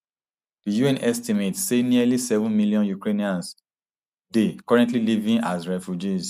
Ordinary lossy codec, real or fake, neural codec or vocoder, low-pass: none; real; none; 14.4 kHz